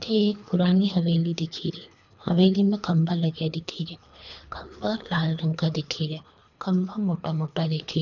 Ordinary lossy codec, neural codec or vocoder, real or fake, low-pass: none; codec, 24 kHz, 3 kbps, HILCodec; fake; 7.2 kHz